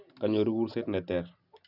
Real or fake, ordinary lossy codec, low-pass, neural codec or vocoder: real; none; 5.4 kHz; none